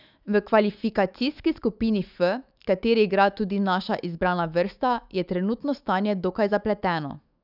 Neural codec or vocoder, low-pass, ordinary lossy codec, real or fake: autoencoder, 48 kHz, 128 numbers a frame, DAC-VAE, trained on Japanese speech; 5.4 kHz; none; fake